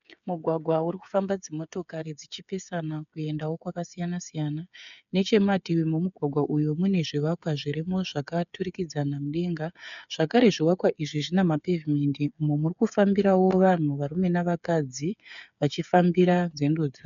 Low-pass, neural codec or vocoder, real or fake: 7.2 kHz; codec, 16 kHz, 8 kbps, FreqCodec, smaller model; fake